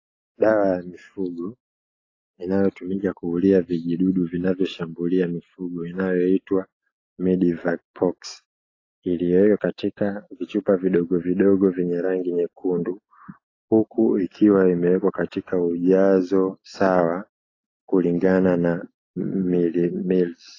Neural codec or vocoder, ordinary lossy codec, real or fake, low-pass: none; AAC, 32 kbps; real; 7.2 kHz